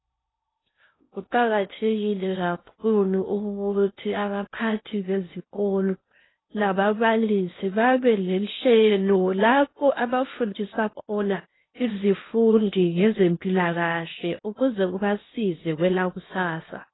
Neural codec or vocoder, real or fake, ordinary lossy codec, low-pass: codec, 16 kHz in and 24 kHz out, 0.6 kbps, FocalCodec, streaming, 4096 codes; fake; AAC, 16 kbps; 7.2 kHz